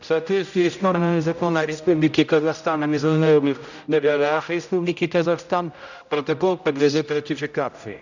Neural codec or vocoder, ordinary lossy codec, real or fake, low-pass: codec, 16 kHz, 0.5 kbps, X-Codec, HuBERT features, trained on general audio; none; fake; 7.2 kHz